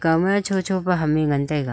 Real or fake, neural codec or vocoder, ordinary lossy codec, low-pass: real; none; none; none